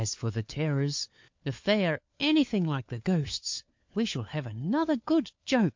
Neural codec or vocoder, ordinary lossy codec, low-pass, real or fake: codec, 16 kHz, 4 kbps, FunCodec, trained on LibriTTS, 50 frames a second; MP3, 48 kbps; 7.2 kHz; fake